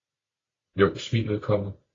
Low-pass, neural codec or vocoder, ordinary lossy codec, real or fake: 7.2 kHz; none; AAC, 32 kbps; real